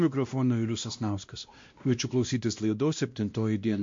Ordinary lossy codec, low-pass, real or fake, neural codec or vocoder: MP3, 48 kbps; 7.2 kHz; fake; codec, 16 kHz, 1 kbps, X-Codec, WavLM features, trained on Multilingual LibriSpeech